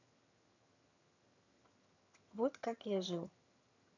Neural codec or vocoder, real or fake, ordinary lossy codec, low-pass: vocoder, 22.05 kHz, 80 mel bands, HiFi-GAN; fake; none; 7.2 kHz